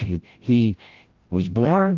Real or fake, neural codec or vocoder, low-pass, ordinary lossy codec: fake; codec, 16 kHz, 0.5 kbps, FreqCodec, larger model; 7.2 kHz; Opus, 16 kbps